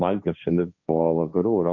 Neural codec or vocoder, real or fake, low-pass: codec, 16 kHz, 1.1 kbps, Voila-Tokenizer; fake; 7.2 kHz